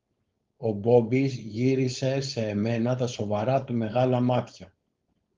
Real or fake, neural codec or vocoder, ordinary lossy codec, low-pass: fake; codec, 16 kHz, 4.8 kbps, FACodec; Opus, 32 kbps; 7.2 kHz